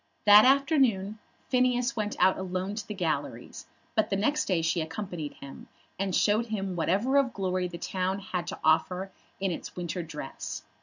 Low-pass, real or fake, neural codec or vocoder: 7.2 kHz; real; none